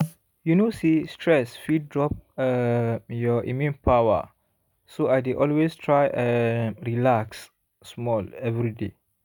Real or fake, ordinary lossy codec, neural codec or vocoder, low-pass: real; none; none; none